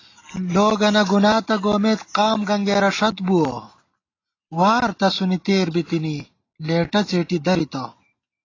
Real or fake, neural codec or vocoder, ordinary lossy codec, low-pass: real; none; AAC, 32 kbps; 7.2 kHz